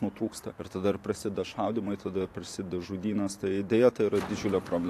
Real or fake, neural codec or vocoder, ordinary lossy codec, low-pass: fake; vocoder, 44.1 kHz, 128 mel bands every 512 samples, BigVGAN v2; AAC, 64 kbps; 14.4 kHz